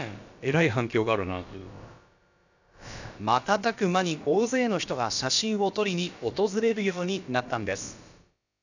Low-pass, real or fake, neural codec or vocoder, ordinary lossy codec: 7.2 kHz; fake; codec, 16 kHz, about 1 kbps, DyCAST, with the encoder's durations; none